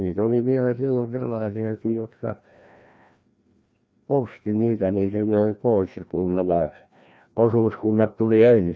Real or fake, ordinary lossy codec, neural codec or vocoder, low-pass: fake; none; codec, 16 kHz, 1 kbps, FreqCodec, larger model; none